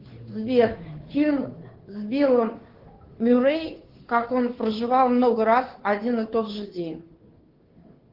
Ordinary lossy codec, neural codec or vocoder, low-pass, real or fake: Opus, 16 kbps; codec, 16 kHz, 2 kbps, FunCodec, trained on Chinese and English, 25 frames a second; 5.4 kHz; fake